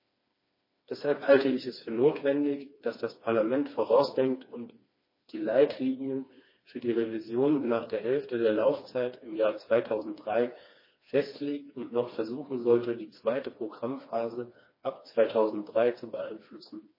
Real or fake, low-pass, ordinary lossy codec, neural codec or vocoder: fake; 5.4 kHz; MP3, 24 kbps; codec, 16 kHz, 2 kbps, FreqCodec, smaller model